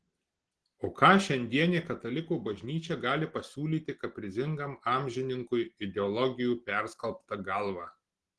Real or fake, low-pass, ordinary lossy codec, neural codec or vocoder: real; 10.8 kHz; Opus, 16 kbps; none